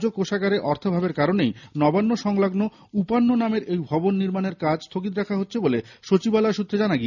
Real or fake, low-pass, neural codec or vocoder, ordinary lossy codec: real; 7.2 kHz; none; none